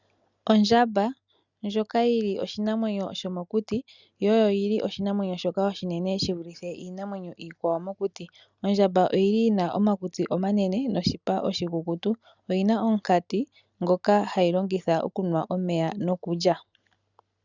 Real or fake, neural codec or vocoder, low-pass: real; none; 7.2 kHz